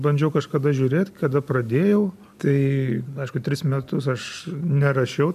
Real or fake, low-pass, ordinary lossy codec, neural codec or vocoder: real; 14.4 kHz; AAC, 96 kbps; none